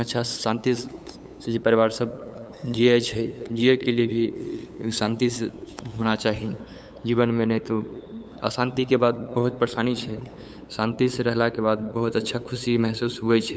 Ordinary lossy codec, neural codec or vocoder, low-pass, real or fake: none; codec, 16 kHz, 8 kbps, FunCodec, trained on LibriTTS, 25 frames a second; none; fake